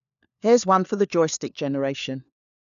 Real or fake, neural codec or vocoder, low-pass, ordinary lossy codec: fake; codec, 16 kHz, 4 kbps, FunCodec, trained on LibriTTS, 50 frames a second; 7.2 kHz; none